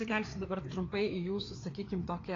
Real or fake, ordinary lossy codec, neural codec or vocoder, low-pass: fake; AAC, 32 kbps; codec, 16 kHz, 4 kbps, FreqCodec, larger model; 7.2 kHz